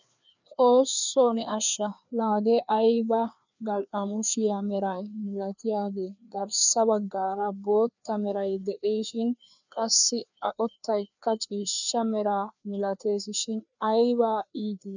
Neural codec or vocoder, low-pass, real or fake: codec, 16 kHz, 2 kbps, FreqCodec, larger model; 7.2 kHz; fake